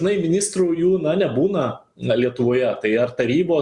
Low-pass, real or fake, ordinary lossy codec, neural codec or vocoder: 10.8 kHz; real; Opus, 64 kbps; none